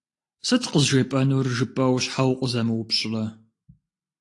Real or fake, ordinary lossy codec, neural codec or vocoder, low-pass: real; AAC, 48 kbps; none; 10.8 kHz